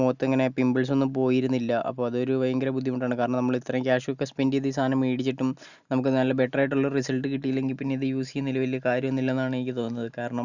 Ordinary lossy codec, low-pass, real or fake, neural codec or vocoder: none; 7.2 kHz; real; none